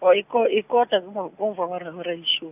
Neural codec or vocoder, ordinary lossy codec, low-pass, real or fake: none; none; 3.6 kHz; real